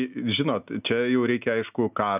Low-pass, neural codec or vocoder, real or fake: 3.6 kHz; none; real